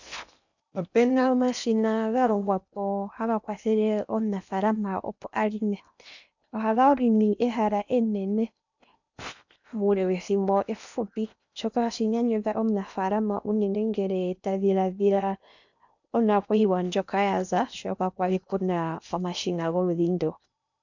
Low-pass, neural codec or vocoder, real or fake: 7.2 kHz; codec, 16 kHz in and 24 kHz out, 0.8 kbps, FocalCodec, streaming, 65536 codes; fake